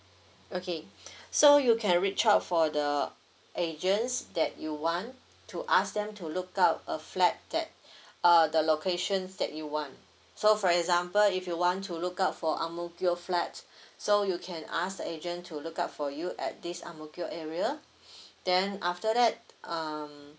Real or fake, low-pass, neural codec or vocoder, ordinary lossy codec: real; none; none; none